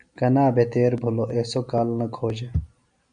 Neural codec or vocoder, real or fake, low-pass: none; real; 9.9 kHz